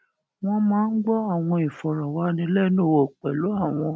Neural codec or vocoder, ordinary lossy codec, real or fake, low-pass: none; none; real; none